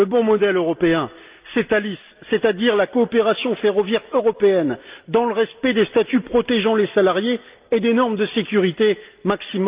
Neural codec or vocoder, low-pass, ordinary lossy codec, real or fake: none; 3.6 kHz; Opus, 24 kbps; real